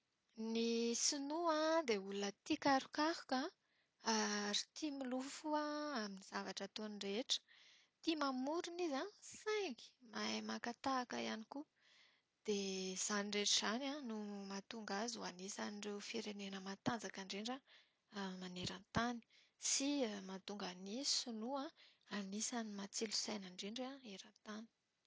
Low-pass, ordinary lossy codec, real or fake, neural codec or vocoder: none; none; real; none